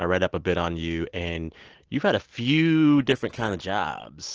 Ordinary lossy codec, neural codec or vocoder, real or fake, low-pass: Opus, 16 kbps; none; real; 7.2 kHz